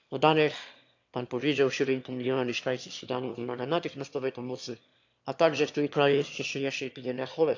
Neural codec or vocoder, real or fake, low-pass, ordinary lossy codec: autoencoder, 22.05 kHz, a latent of 192 numbers a frame, VITS, trained on one speaker; fake; 7.2 kHz; AAC, 48 kbps